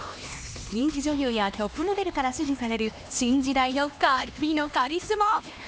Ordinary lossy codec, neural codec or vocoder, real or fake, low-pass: none; codec, 16 kHz, 2 kbps, X-Codec, HuBERT features, trained on LibriSpeech; fake; none